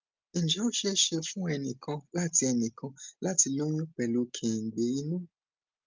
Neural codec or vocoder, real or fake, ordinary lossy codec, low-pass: none; real; Opus, 24 kbps; 7.2 kHz